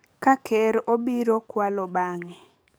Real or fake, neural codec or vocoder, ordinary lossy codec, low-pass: fake; codec, 44.1 kHz, 7.8 kbps, DAC; none; none